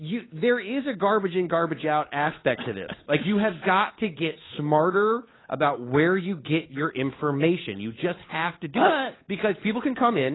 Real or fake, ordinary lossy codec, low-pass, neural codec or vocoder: fake; AAC, 16 kbps; 7.2 kHz; codec, 16 kHz, 8 kbps, FunCodec, trained on Chinese and English, 25 frames a second